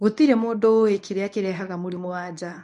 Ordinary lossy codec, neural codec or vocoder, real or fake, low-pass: MP3, 96 kbps; codec, 24 kHz, 0.9 kbps, WavTokenizer, medium speech release version 1; fake; 10.8 kHz